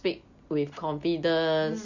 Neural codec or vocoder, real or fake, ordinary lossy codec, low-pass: none; real; none; 7.2 kHz